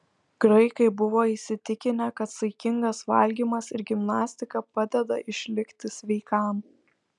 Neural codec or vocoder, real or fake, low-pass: none; real; 10.8 kHz